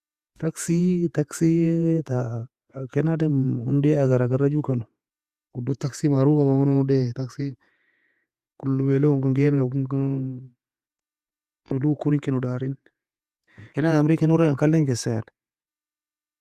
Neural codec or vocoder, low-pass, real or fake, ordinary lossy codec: vocoder, 48 kHz, 128 mel bands, Vocos; 14.4 kHz; fake; Opus, 64 kbps